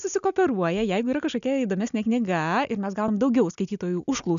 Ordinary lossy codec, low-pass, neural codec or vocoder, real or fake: MP3, 96 kbps; 7.2 kHz; none; real